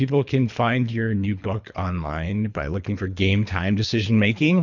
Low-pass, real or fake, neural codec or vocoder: 7.2 kHz; fake; codec, 24 kHz, 3 kbps, HILCodec